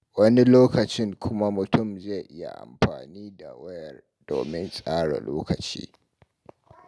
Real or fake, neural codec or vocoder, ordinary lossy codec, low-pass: real; none; none; none